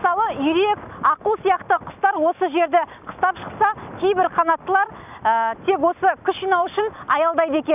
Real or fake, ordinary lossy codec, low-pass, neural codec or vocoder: real; none; 3.6 kHz; none